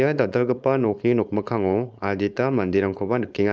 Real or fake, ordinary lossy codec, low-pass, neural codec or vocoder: fake; none; none; codec, 16 kHz, 4.8 kbps, FACodec